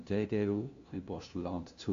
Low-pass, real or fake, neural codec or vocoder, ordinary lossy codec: 7.2 kHz; fake; codec, 16 kHz, 0.5 kbps, FunCodec, trained on LibriTTS, 25 frames a second; AAC, 48 kbps